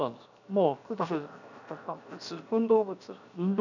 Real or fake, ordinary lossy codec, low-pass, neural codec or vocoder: fake; none; 7.2 kHz; codec, 16 kHz, 0.7 kbps, FocalCodec